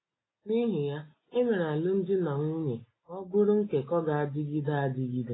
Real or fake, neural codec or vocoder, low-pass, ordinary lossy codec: real; none; 7.2 kHz; AAC, 16 kbps